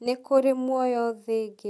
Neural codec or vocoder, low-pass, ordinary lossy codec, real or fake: none; none; none; real